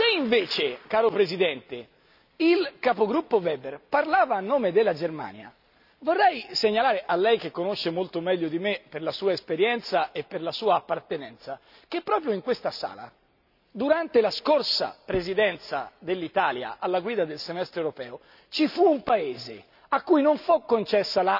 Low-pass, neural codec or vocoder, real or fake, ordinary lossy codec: 5.4 kHz; none; real; none